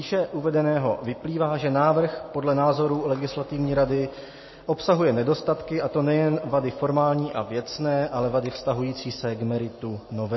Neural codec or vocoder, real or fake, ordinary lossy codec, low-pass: none; real; MP3, 24 kbps; 7.2 kHz